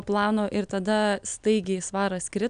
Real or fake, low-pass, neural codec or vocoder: real; 9.9 kHz; none